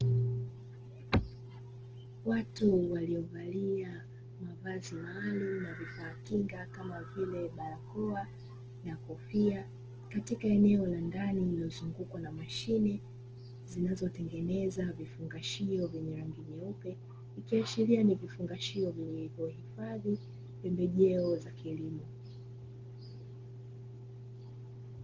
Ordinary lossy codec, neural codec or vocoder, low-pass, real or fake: Opus, 16 kbps; none; 7.2 kHz; real